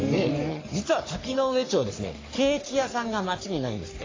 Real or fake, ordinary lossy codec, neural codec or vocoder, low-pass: fake; AAC, 32 kbps; codec, 44.1 kHz, 3.4 kbps, Pupu-Codec; 7.2 kHz